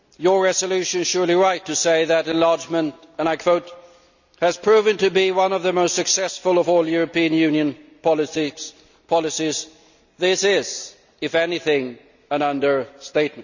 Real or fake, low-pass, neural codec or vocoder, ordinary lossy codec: real; 7.2 kHz; none; none